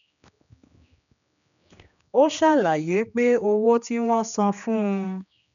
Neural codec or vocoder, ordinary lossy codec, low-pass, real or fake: codec, 16 kHz, 2 kbps, X-Codec, HuBERT features, trained on general audio; none; 7.2 kHz; fake